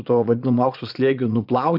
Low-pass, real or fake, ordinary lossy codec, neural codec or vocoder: 5.4 kHz; fake; AAC, 48 kbps; vocoder, 22.05 kHz, 80 mel bands, Vocos